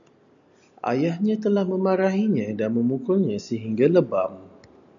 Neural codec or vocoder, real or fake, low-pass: none; real; 7.2 kHz